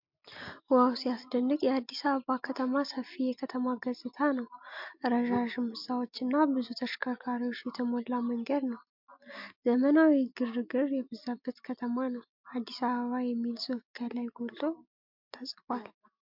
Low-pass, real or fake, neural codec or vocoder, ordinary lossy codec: 5.4 kHz; real; none; MP3, 48 kbps